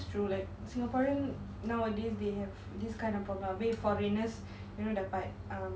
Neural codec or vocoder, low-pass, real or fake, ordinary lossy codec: none; none; real; none